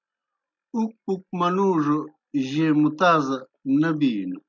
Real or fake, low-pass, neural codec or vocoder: real; 7.2 kHz; none